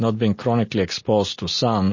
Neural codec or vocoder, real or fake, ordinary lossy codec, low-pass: none; real; MP3, 32 kbps; 7.2 kHz